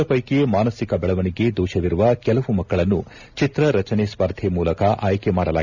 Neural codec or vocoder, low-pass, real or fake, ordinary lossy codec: none; 7.2 kHz; real; none